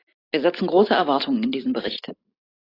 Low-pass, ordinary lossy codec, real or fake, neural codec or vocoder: 5.4 kHz; AAC, 24 kbps; real; none